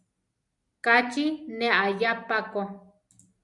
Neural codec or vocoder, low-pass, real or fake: none; 10.8 kHz; real